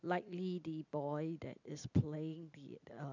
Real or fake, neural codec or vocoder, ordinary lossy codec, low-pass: real; none; none; 7.2 kHz